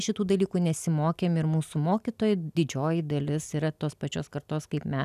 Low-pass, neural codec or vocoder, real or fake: 14.4 kHz; none; real